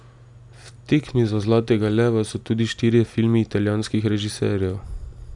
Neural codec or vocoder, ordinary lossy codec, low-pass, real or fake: none; none; 10.8 kHz; real